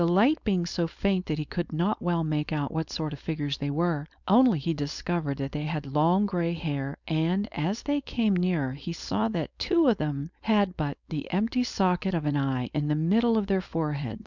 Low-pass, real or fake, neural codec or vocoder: 7.2 kHz; fake; codec, 16 kHz, 4.8 kbps, FACodec